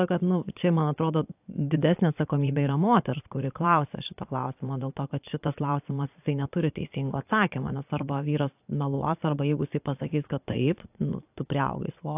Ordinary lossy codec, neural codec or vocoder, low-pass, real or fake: AAC, 32 kbps; vocoder, 22.05 kHz, 80 mel bands, Vocos; 3.6 kHz; fake